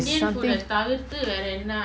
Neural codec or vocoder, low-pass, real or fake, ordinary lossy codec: none; none; real; none